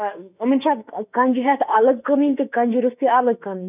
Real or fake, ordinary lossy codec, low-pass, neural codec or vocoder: fake; none; 3.6 kHz; autoencoder, 48 kHz, 32 numbers a frame, DAC-VAE, trained on Japanese speech